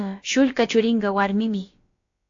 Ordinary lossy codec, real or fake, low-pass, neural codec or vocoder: AAC, 48 kbps; fake; 7.2 kHz; codec, 16 kHz, about 1 kbps, DyCAST, with the encoder's durations